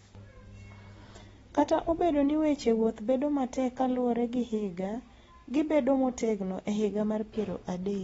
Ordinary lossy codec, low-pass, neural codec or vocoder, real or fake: AAC, 24 kbps; 19.8 kHz; none; real